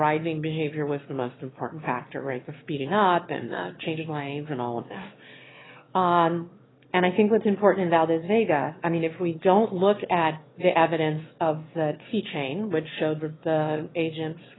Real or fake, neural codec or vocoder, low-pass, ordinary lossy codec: fake; autoencoder, 22.05 kHz, a latent of 192 numbers a frame, VITS, trained on one speaker; 7.2 kHz; AAC, 16 kbps